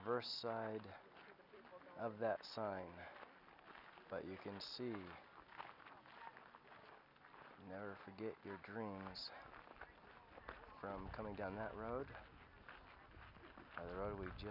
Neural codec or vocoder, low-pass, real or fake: none; 5.4 kHz; real